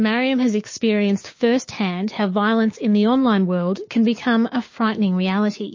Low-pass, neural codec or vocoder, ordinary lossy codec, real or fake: 7.2 kHz; codec, 16 kHz, 6 kbps, DAC; MP3, 32 kbps; fake